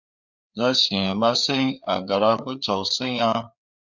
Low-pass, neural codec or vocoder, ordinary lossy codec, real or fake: 7.2 kHz; codec, 16 kHz, 4 kbps, FreqCodec, larger model; Opus, 64 kbps; fake